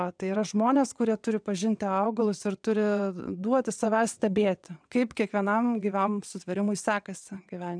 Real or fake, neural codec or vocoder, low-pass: fake; vocoder, 22.05 kHz, 80 mel bands, WaveNeXt; 9.9 kHz